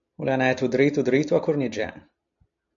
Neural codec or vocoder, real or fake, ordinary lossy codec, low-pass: none; real; AAC, 64 kbps; 7.2 kHz